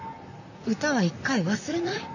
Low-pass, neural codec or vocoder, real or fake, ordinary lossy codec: 7.2 kHz; vocoder, 44.1 kHz, 128 mel bands, Pupu-Vocoder; fake; none